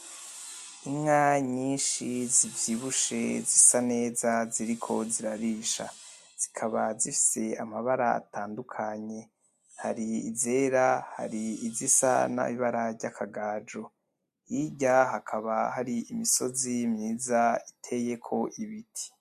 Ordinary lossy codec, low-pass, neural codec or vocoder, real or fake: MP3, 64 kbps; 14.4 kHz; none; real